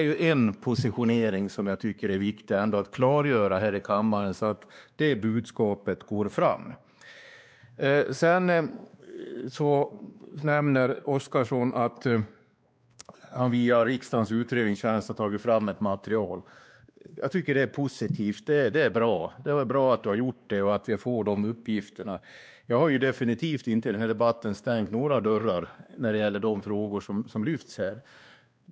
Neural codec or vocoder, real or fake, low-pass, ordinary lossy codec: codec, 16 kHz, 2 kbps, X-Codec, WavLM features, trained on Multilingual LibriSpeech; fake; none; none